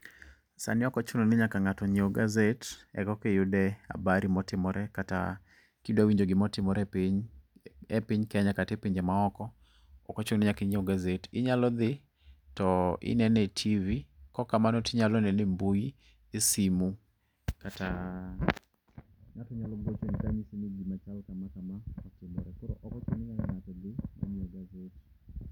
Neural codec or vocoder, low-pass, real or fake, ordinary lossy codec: none; 19.8 kHz; real; none